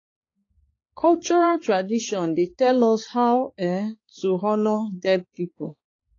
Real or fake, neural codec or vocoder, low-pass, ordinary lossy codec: fake; codec, 16 kHz, 4 kbps, X-Codec, HuBERT features, trained on balanced general audio; 7.2 kHz; AAC, 32 kbps